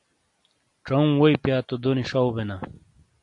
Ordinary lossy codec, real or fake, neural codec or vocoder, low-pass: AAC, 64 kbps; real; none; 10.8 kHz